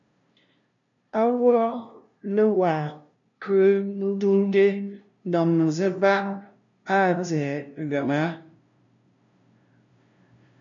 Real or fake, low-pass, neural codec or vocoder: fake; 7.2 kHz; codec, 16 kHz, 0.5 kbps, FunCodec, trained on LibriTTS, 25 frames a second